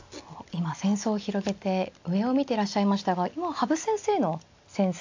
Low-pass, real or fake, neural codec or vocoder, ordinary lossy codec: 7.2 kHz; real; none; AAC, 48 kbps